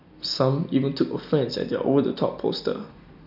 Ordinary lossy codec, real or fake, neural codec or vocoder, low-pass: none; real; none; 5.4 kHz